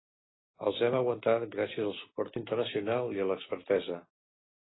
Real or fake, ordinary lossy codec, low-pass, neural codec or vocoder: real; AAC, 16 kbps; 7.2 kHz; none